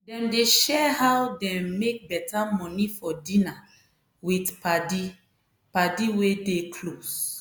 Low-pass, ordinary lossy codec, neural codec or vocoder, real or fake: none; none; none; real